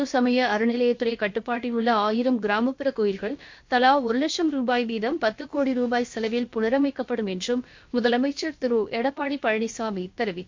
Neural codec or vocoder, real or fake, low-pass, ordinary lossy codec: codec, 16 kHz, about 1 kbps, DyCAST, with the encoder's durations; fake; 7.2 kHz; MP3, 48 kbps